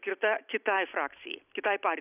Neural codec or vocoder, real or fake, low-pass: none; real; 3.6 kHz